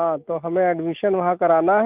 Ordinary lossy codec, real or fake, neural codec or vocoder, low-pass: Opus, 32 kbps; real; none; 3.6 kHz